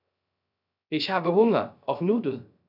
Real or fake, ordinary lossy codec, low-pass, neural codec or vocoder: fake; none; 5.4 kHz; codec, 16 kHz, 0.3 kbps, FocalCodec